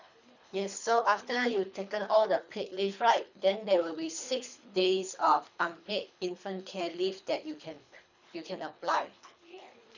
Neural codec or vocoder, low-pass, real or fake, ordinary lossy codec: codec, 24 kHz, 3 kbps, HILCodec; 7.2 kHz; fake; none